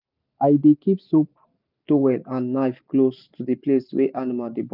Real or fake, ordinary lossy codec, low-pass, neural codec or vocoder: real; none; 5.4 kHz; none